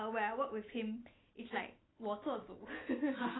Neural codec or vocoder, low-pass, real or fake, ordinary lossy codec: none; 7.2 kHz; real; AAC, 16 kbps